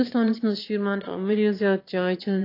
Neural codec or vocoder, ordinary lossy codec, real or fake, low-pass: autoencoder, 22.05 kHz, a latent of 192 numbers a frame, VITS, trained on one speaker; AAC, 32 kbps; fake; 5.4 kHz